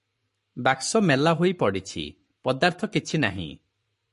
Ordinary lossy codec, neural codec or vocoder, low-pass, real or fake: MP3, 48 kbps; codec, 44.1 kHz, 7.8 kbps, Pupu-Codec; 14.4 kHz; fake